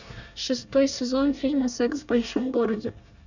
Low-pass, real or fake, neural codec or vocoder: 7.2 kHz; fake; codec, 24 kHz, 1 kbps, SNAC